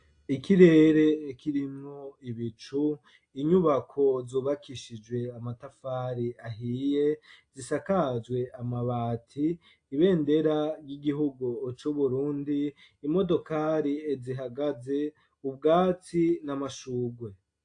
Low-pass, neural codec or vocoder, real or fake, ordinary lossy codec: 9.9 kHz; none; real; AAC, 48 kbps